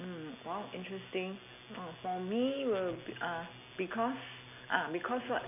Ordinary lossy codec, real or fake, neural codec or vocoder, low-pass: none; real; none; 3.6 kHz